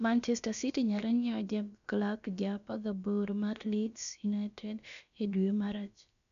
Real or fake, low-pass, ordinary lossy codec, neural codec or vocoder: fake; 7.2 kHz; none; codec, 16 kHz, about 1 kbps, DyCAST, with the encoder's durations